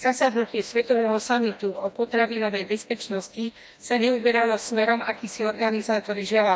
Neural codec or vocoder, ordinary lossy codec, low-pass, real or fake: codec, 16 kHz, 1 kbps, FreqCodec, smaller model; none; none; fake